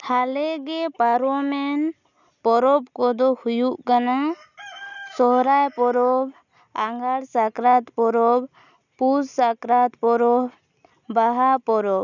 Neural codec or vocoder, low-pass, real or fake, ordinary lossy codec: none; 7.2 kHz; real; none